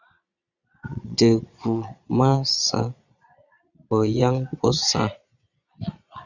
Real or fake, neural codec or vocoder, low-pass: fake; vocoder, 22.05 kHz, 80 mel bands, Vocos; 7.2 kHz